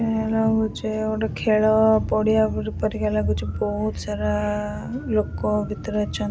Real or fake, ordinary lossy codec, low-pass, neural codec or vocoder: real; none; none; none